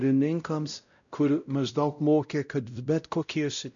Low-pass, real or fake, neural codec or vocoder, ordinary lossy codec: 7.2 kHz; fake; codec, 16 kHz, 0.5 kbps, X-Codec, WavLM features, trained on Multilingual LibriSpeech; MP3, 96 kbps